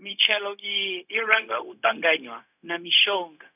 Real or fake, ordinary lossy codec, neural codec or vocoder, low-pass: fake; none; codec, 16 kHz, 0.4 kbps, LongCat-Audio-Codec; 3.6 kHz